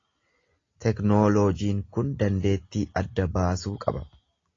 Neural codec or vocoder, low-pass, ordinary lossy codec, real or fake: none; 7.2 kHz; AAC, 32 kbps; real